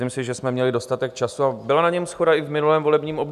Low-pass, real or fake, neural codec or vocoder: 14.4 kHz; real; none